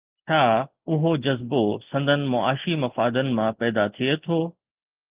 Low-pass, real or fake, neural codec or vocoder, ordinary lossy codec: 3.6 kHz; real; none; Opus, 32 kbps